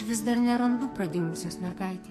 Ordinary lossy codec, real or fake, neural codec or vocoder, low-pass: MP3, 64 kbps; fake; codec, 44.1 kHz, 2.6 kbps, SNAC; 14.4 kHz